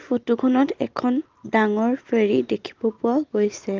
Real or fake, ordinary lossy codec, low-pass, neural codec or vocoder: real; Opus, 32 kbps; 7.2 kHz; none